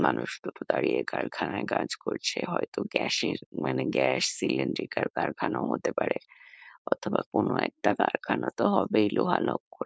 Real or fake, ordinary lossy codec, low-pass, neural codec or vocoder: fake; none; none; codec, 16 kHz, 4.8 kbps, FACodec